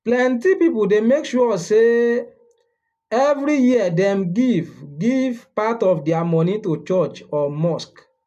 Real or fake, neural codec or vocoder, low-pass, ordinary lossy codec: real; none; 14.4 kHz; none